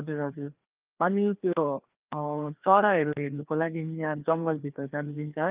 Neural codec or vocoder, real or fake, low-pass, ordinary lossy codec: codec, 16 kHz, 2 kbps, FreqCodec, larger model; fake; 3.6 kHz; Opus, 24 kbps